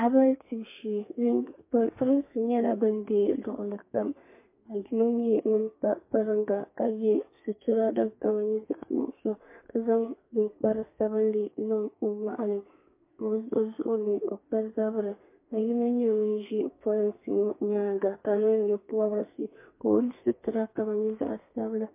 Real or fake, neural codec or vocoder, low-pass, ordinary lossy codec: fake; codec, 32 kHz, 1.9 kbps, SNAC; 3.6 kHz; MP3, 24 kbps